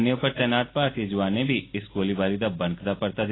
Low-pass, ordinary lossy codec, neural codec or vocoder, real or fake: 7.2 kHz; AAC, 16 kbps; none; real